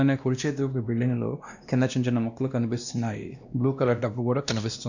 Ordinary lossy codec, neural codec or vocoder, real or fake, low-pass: none; codec, 16 kHz, 1 kbps, X-Codec, WavLM features, trained on Multilingual LibriSpeech; fake; 7.2 kHz